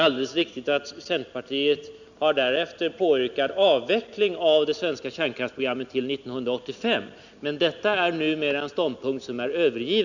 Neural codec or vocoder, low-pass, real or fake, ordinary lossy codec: none; 7.2 kHz; real; none